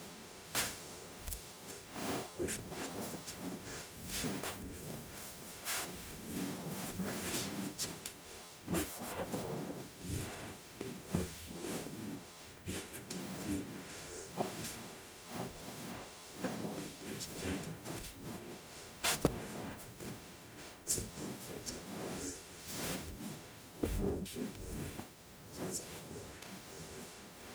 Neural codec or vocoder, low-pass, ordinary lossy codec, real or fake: codec, 44.1 kHz, 0.9 kbps, DAC; none; none; fake